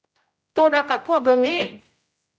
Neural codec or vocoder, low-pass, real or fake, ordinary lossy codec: codec, 16 kHz, 0.5 kbps, X-Codec, HuBERT features, trained on general audio; none; fake; none